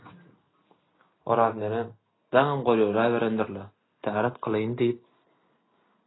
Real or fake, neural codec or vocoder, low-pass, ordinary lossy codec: real; none; 7.2 kHz; AAC, 16 kbps